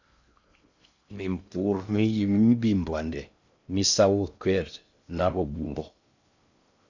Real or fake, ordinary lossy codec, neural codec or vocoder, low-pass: fake; Opus, 64 kbps; codec, 16 kHz in and 24 kHz out, 0.6 kbps, FocalCodec, streaming, 4096 codes; 7.2 kHz